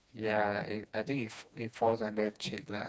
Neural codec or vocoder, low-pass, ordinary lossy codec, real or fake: codec, 16 kHz, 2 kbps, FreqCodec, smaller model; none; none; fake